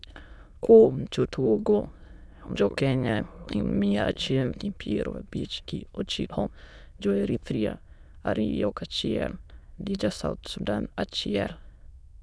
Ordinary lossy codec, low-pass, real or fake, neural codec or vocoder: none; none; fake; autoencoder, 22.05 kHz, a latent of 192 numbers a frame, VITS, trained on many speakers